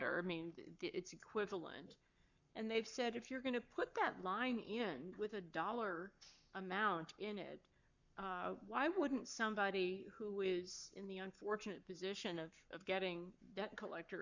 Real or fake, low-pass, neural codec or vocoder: fake; 7.2 kHz; codec, 44.1 kHz, 7.8 kbps, Pupu-Codec